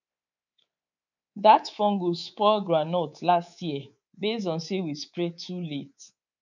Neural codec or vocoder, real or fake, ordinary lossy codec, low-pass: codec, 24 kHz, 3.1 kbps, DualCodec; fake; none; 7.2 kHz